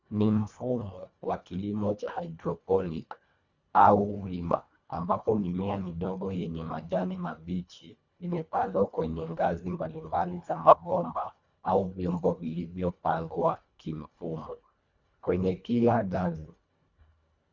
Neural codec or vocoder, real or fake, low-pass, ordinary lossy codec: codec, 24 kHz, 1.5 kbps, HILCodec; fake; 7.2 kHz; Opus, 64 kbps